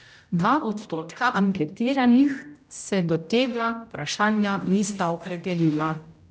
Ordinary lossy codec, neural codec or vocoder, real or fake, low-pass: none; codec, 16 kHz, 0.5 kbps, X-Codec, HuBERT features, trained on general audio; fake; none